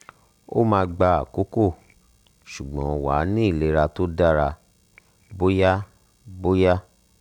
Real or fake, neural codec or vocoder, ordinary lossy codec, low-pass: real; none; none; 19.8 kHz